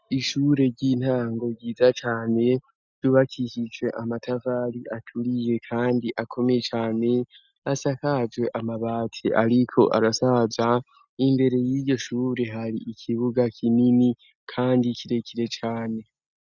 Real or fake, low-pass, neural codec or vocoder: real; 7.2 kHz; none